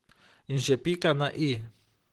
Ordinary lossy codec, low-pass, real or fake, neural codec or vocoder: Opus, 16 kbps; 19.8 kHz; fake; vocoder, 44.1 kHz, 128 mel bands, Pupu-Vocoder